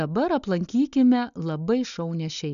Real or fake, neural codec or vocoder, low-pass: real; none; 7.2 kHz